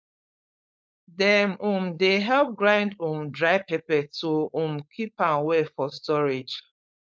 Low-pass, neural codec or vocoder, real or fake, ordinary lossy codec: none; codec, 16 kHz, 4.8 kbps, FACodec; fake; none